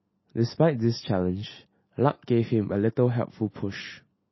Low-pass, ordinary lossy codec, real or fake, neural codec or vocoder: 7.2 kHz; MP3, 24 kbps; real; none